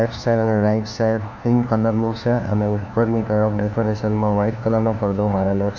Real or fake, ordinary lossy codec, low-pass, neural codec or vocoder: fake; none; none; codec, 16 kHz, 1 kbps, FunCodec, trained on LibriTTS, 50 frames a second